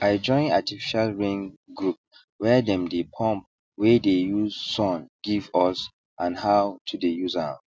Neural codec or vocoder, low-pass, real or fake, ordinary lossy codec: none; none; real; none